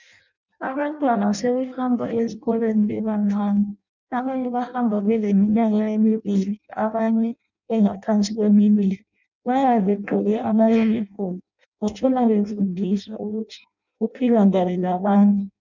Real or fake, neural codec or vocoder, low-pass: fake; codec, 16 kHz in and 24 kHz out, 0.6 kbps, FireRedTTS-2 codec; 7.2 kHz